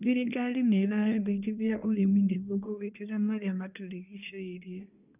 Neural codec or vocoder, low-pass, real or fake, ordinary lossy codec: codec, 24 kHz, 1 kbps, SNAC; 3.6 kHz; fake; none